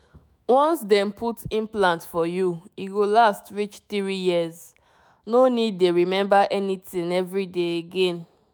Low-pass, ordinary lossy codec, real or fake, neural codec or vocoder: none; none; fake; autoencoder, 48 kHz, 128 numbers a frame, DAC-VAE, trained on Japanese speech